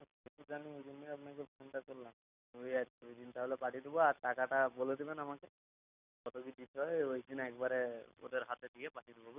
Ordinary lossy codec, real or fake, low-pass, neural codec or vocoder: none; real; 3.6 kHz; none